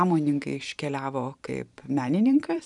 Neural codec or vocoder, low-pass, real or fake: vocoder, 44.1 kHz, 128 mel bands every 512 samples, BigVGAN v2; 10.8 kHz; fake